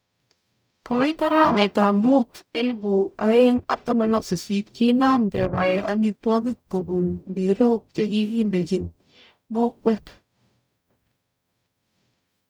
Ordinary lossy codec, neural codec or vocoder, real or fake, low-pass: none; codec, 44.1 kHz, 0.9 kbps, DAC; fake; none